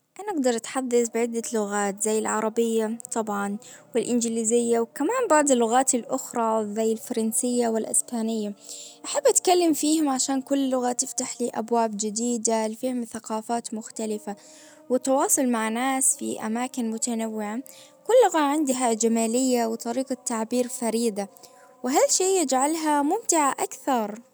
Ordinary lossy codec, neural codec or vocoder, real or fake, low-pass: none; none; real; none